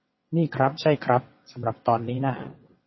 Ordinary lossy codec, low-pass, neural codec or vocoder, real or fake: MP3, 24 kbps; 7.2 kHz; vocoder, 22.05 kHz, 80 mel bands, WaveNeXt; fake